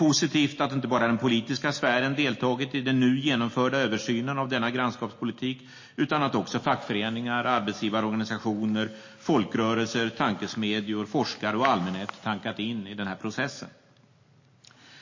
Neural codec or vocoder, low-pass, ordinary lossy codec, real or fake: none; 7.2 kHz; MP3, 32 kbps; real